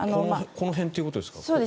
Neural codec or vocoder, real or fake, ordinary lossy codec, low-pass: none; real; none; none